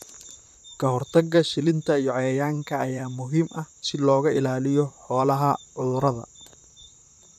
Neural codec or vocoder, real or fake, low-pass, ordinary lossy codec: vocoder, 44.1 kHz, 128 mel bands, Pupu-Vocoder; fake; 14.4 kHz; none